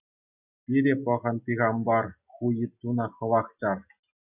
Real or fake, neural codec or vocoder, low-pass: real; none; 3.6 kHz